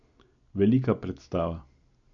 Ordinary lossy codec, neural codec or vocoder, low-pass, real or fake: none; none; 7.2 kHz; real